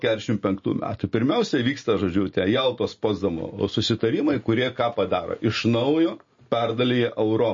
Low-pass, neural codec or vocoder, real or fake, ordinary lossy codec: 7.2 kHz; none; real; MP3, 32 kbps